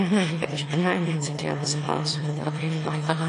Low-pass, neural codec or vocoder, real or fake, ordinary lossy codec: 9.9 kHz; autoencoder, 22.05 kHz, a latent of 192 numbers a frame, VITS, trained on one speaker; fake; AAC, 48 kbps